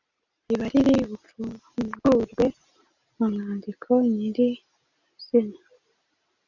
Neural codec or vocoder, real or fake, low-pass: none; real; 7.2 kHz